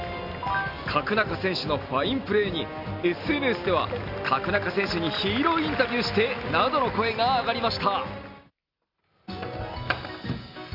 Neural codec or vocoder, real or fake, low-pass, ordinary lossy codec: none; real; 5.4 kHz; none